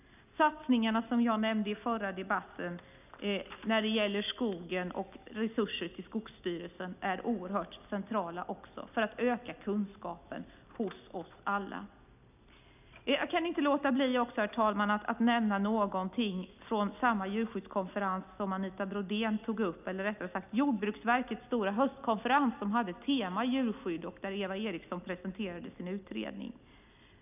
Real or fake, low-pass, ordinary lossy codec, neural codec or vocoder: real; 3.6 kHz; none; none